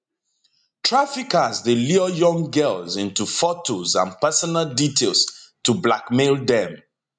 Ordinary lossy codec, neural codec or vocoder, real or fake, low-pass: MP3, 96 kbps; none; real; 9.9 kHz